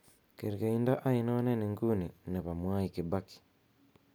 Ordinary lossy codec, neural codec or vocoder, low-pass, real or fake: none; none; none; real